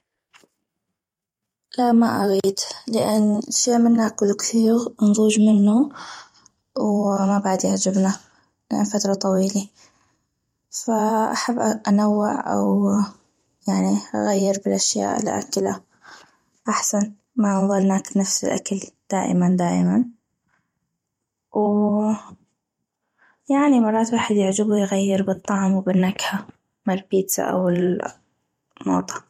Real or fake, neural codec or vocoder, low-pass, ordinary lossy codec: fake; vocoder, 44.1 kHz, 128 mel bands every 512 samples, BigVGAN v2; 19.8 kHz; MP3, 64 kbps